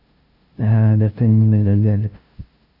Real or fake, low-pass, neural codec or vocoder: fake; 5.4 kHz; codec, 16 kHz, 0.5 kbps, FunCodec, trained on LibriTTS, 25 frames a second